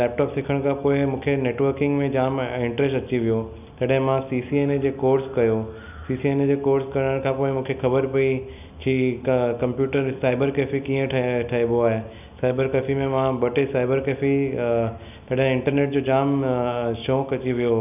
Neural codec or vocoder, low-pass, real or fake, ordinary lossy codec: none; 3.6 kHz; real; none